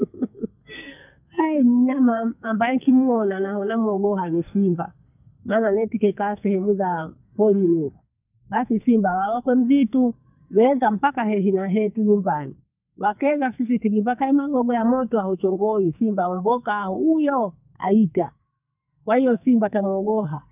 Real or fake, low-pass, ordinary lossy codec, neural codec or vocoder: fake; 3.6 kHz; none; codec, 44.1 kHz, 2.6 kbps, SNAC